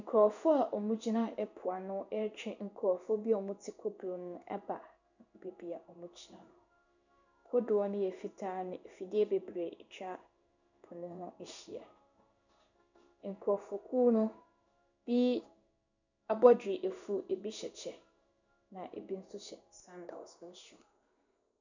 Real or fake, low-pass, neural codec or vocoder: fake; 7.2 kHz; codec, 16 kHz in and 24 kHz out, 1 kbps, XY-Tokenizer